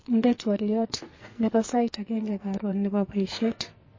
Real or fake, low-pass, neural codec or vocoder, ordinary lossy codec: fake; 7.2 kHz; codec, 44.1 kHz, 2.6 kbps, SNAC; MP3, 32 kbps